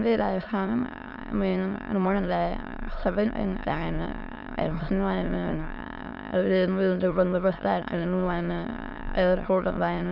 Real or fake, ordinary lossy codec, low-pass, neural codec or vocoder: fake; Opus, 64 kbps; 5.4 kHz; autoencoder, 22.05 kHz, a latent of 192 numbers a frame, VITS, trained on many speakers